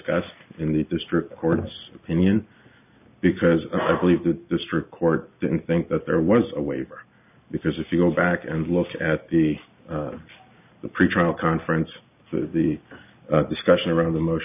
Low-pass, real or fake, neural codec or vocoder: 3.6 kHz; real; none